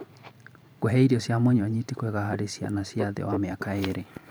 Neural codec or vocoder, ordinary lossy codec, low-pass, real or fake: vocoder, 44.1 kHz, 128 mel bands every 512 samples, BigVGAN v2; none; none; fake